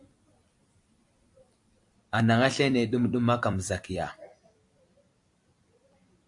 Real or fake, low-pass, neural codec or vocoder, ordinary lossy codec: fake; 10.8 kHz; vocoder, 44.1 kHz, 128 mel bands every 256 samples, BigVGAN v2; AAC, 64 kbps